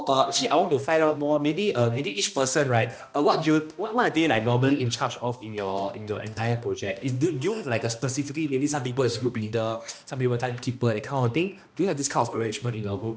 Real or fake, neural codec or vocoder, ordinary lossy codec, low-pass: fake; codec, 16 kHz, 1 kbps, X-Codec, HuBERT features, trained on balanced general audio; none; none